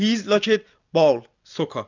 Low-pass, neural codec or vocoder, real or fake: 7.2 kHz; none; real